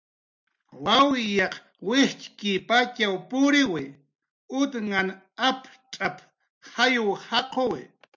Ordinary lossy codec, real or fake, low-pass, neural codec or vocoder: MP3, 64 kbps; real; 7.2 kHz; none